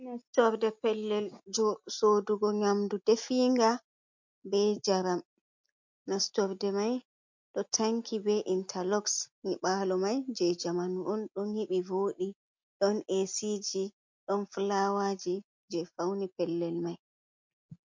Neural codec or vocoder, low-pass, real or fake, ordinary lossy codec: none; 7.2 kHz; real; MP3, 48 kbps